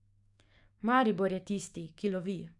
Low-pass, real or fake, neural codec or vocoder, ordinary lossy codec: 10.8 kHz; fake; codec, 44.1 kHz, 7.8 kbps, DAC; none